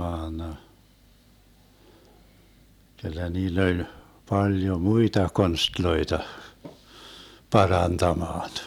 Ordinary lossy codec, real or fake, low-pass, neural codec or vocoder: none; real; 19.8 kHz; none